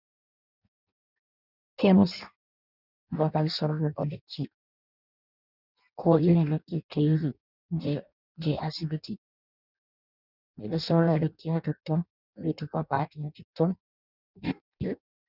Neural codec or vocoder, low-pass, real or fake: codec, 16 kHz in and 24 kHz out, 0.6 kbps, FireRedTTS-2 codec; 5.4 kHz; fake